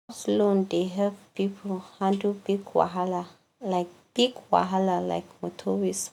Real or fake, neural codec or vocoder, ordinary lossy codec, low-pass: real; none; none; 19.8 kHz